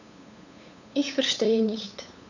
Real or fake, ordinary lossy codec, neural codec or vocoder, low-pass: fake; none; codec, 16 kHz, 8 kbps, FunCodec, trained on LibriTTS, 25 frames a second; 7.2 kHz